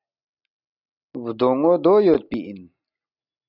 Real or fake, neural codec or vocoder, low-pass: real; none; 5.4 kHz